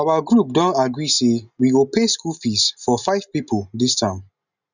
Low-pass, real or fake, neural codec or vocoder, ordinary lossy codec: 7.2 kHz; real; none; none